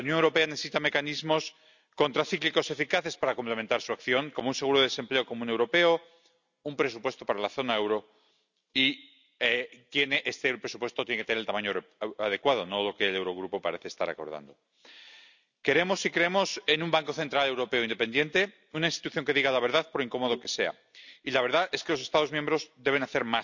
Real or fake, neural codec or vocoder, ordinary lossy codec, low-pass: real; none; none; 7.2 kHz